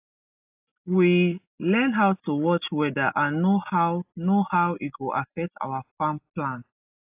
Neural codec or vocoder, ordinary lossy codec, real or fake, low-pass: none; AAC, 32 kbps; real; 3.6 kHz